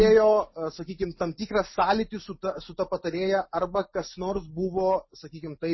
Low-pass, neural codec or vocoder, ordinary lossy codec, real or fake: 7.2 kHz; none; MP3, 24 kbps; real